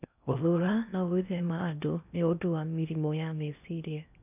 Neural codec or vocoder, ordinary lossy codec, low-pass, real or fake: codec, 16 kHz in and 24 kHz out, 0.6 kbps, FocalCodec, streaming, 4096 codes; none; 3.6 kHz; fake